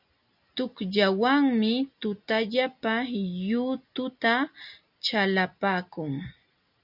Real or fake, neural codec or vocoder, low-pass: real; none; 5.4 kHz